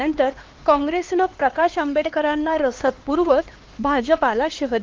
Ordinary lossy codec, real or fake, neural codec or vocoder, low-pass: Opus, 16 kbps; fake; codec, 16 kHz, 2 kbps, X-Codec, HuBERT features, trained on LibriSpeech; 7.2 kHz